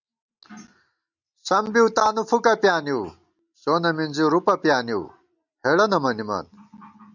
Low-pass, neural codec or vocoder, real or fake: 7.2 kHz; none; real